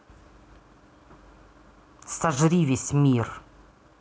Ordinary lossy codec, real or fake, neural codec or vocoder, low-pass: none; real; none; none